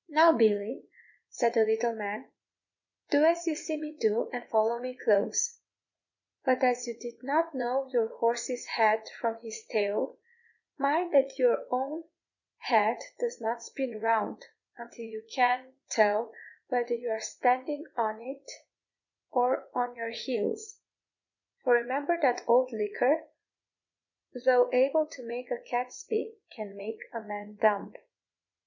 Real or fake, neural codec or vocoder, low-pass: fake; vocoder, 44.1 kHz, 80 mel bands, Vocos; 7.2 kHz